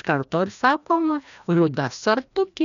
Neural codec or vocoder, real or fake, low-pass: codec, 16 kHz, 1 kbps, FreqCodec, larger model; fake; 7.2 kHz